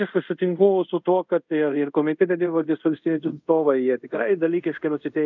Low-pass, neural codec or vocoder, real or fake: 7.2 kHz; codec, 24 kHz, 0.5 kbps, DualCodec; fake